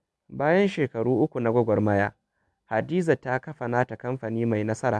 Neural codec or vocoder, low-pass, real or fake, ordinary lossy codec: none; none; real; none